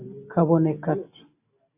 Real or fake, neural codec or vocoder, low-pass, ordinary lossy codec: real; none; 3.6 kHz; AAC, 24 kbps